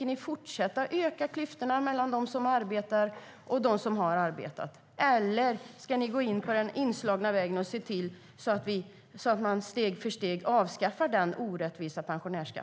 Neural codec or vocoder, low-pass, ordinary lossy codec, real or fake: none; none; none; real